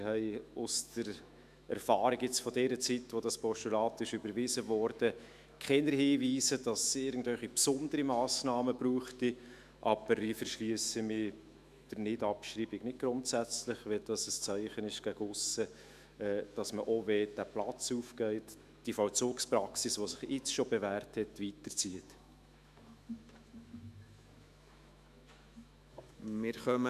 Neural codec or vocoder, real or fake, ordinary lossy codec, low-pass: autoencoder, 48 kHz, 128 numbers a frame, DAC-VAE, trained on Japanese speech; fake; none; 14.4 kHz